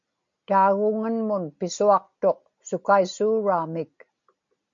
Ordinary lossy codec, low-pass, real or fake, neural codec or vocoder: MP3, 48 kbps; 7.2 kHz; real; none